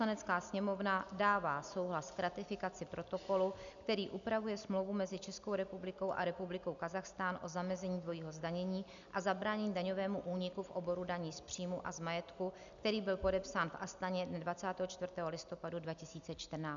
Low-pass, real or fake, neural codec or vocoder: 7.2 kHz; real; none